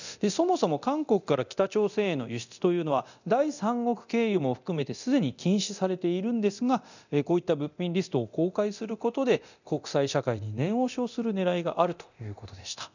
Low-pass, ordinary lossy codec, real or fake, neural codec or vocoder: 7.2 kHz; none; fake; codec, 24 kHz, 0.9 kbps, DualCodec